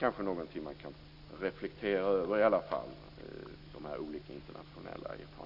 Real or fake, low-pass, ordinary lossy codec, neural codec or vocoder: real; 5.4 kHz; none; none